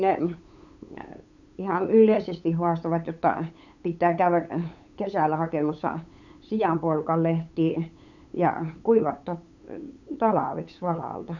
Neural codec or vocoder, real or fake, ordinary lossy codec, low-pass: codec, 16 kHz, 8 kbps, FunCodec, trained on LibriTTS, 25 frames a second; fake; none; 7.2 kHz